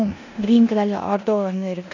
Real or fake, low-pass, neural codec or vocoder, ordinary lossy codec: fake; 7.2 kHz; codec, 16 kHz in and 24 kHz out, 0.9 kbps, LongCat-Audio-Codec, four codebook decoder; none